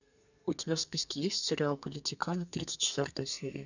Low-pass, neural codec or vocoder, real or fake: 7.2 kHz; codec, 32 kHz, 1.9 kbps, SNAC; fake